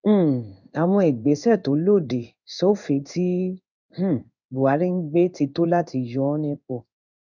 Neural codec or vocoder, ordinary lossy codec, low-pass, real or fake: codec, 16 kHz in and 24 kHz out, 1 kbps, XY-Tokenizer; none; 7.2 kHz; fake